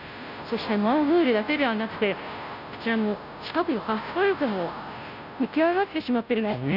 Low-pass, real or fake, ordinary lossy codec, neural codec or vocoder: 5.4 kHz; fake; none; codec, 16 kHz, 0.5 kbps, FunCodec, trained on Chinese and English, 25 frames a second